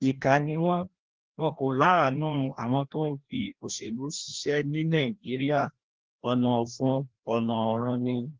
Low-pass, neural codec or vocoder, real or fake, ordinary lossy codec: 7.2 kHz; codec, 16 kHz, 1 kbps, FreqCodec, larger model; fake; Opus, 16 kbps